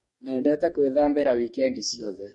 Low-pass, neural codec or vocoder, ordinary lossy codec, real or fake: 10.8 kHz; codec, 44.1 kHz, 2.6 kbps, DAC; MP3, 48 kbps; fake